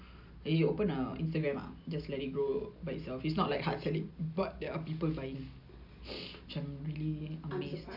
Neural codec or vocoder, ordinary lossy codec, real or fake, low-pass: none; none; real; 5.4 kHz